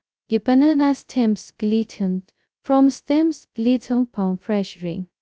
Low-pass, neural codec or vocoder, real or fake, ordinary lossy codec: none; codec, 16 kHz, 0.2 kbps, FocalCodec; fake; none